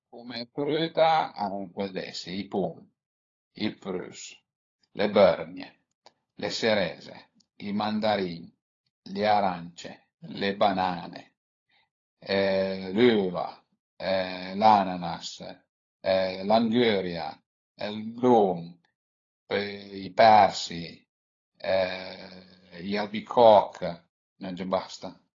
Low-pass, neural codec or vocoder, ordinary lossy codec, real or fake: 7.2 kHz; codec, 16 kHz, 16 kbps, FunCodec, trained on LibriTTS, 50 frames a second; AAC, 32 kbps; fake